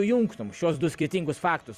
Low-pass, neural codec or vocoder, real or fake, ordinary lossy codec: 14.4 kHz; vocoder, 44.1 kHz, 128 mel bands every 256 samples, BigVGAN v2; fake; Opus, 64 kbps